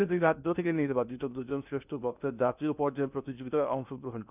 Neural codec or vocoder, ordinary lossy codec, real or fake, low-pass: codec, 16 kHz in and 24 kHz out, 0.6 kbps, FocalCodec, streaming, 2048 codes; none; fake; 3.6 kHz